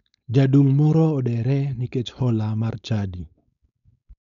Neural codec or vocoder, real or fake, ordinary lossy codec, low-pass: codec, 16 kHz, 4.8 kbps, FACodec; fake; none; 7.2 kHz